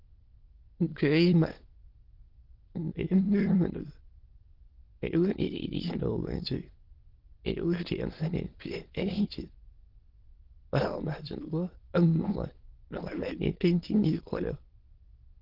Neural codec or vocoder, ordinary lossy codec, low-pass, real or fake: autoencoder, 22.05 kHz, a latent of 192 numbers a frame, VITS, trained on many speakers; Opus, 16 kbps; 5.4 kHz; fake